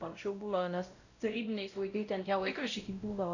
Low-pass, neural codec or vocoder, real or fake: 7.2 kHz; codec, 16 kHz, 0.5 kbps, X-Codec, WavLM features, trained on Multilingual LibriSpeech; fake